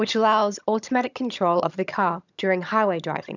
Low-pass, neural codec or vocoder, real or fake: 7.2 kHz; vocoder, 22.05 kHz, 80 mel bands, HiFi-GAN; fake